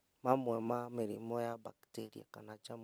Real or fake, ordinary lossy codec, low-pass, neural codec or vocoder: real; none; none; none